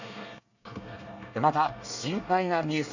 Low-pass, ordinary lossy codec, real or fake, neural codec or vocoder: 7.2 kHz; none; fake; codec, 24 kHz, 1 kbps, SNAC